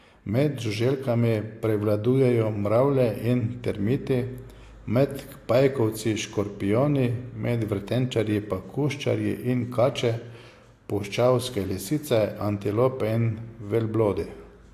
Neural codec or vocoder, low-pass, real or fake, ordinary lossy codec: none; 14.4 kHz; real; AAC, 64 kbps